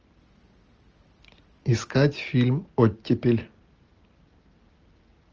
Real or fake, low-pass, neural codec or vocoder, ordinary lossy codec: real; 7.2 kHz; none; Opus, 24 kbps